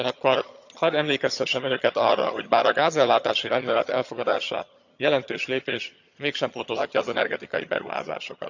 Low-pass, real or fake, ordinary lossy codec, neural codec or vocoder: 7.2 kHz; fake; none; vocoder, 22.05 kHz, 80 mel bands, HiFi-GAN